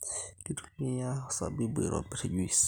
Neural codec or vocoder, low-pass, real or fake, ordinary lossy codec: none; none; real; none